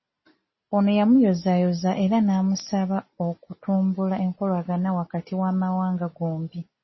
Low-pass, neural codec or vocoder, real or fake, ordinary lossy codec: 7.2 kHz; none; real; MP3, 24 kbps